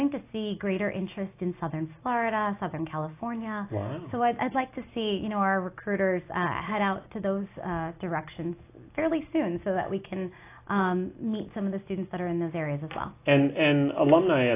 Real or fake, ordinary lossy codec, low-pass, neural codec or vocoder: real; AAC, 24 kbps; 3.6 kHz; none